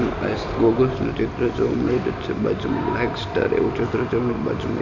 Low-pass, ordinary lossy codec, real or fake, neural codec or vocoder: 7.2 kHz; none; fake; vocoder, 44.1 kHz, 128 mel bands every 256 samples, BigVGAN v2